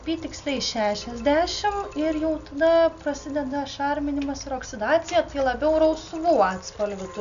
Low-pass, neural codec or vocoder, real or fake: 7.2 kHz; none; real